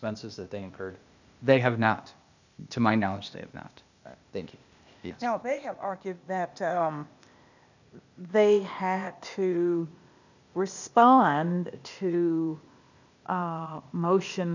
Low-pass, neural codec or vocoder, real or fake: 7.2 kHz; codec, 16 kHz, 0.8 kbps, ZipCodec; fake